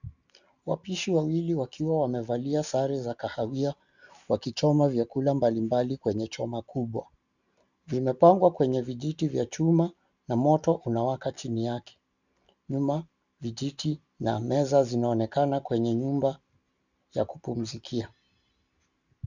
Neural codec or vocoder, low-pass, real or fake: none; 7.2 kHz; real